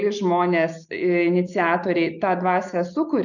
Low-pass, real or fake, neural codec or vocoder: 7.2 kHz; real; none